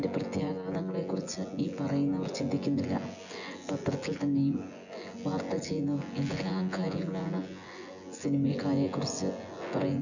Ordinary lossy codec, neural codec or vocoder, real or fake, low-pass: none; vocoder, 24 kHz, 100 mel bands, Vocos; fake; 7.2 kHz